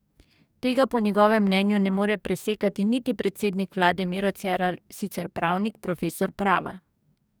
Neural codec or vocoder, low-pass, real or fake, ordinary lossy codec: codec, 44.1 kHz, 2.6 kbps, DAC; none; fake; none